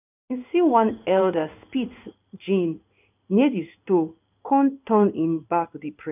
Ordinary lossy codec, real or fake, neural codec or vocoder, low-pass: none; fake; codec, 16 kHz in and 24 kHz out, 1 kbps, XY-Tokenizer; 3.6 kHz